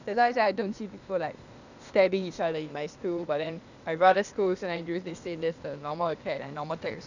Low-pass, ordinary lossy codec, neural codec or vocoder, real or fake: 7.2 kHz; none; codec, 16 kHz, 0.8 kbps, ZipCodec; fake